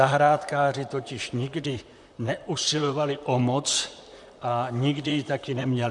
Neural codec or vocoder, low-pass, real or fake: vocoder, 44.1 kHz, 128 mel bands, Pupu-Vocoder; 10.8 kHz; fake